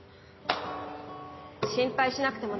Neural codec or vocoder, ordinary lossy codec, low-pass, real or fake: none; MP3, 24 kbps; 7.2 kHz; real